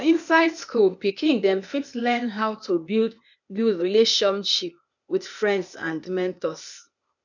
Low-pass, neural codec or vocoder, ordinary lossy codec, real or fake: 7.2 kHz; codec, 16 kHz, 0.8 kbps, ZipCodec; none; fake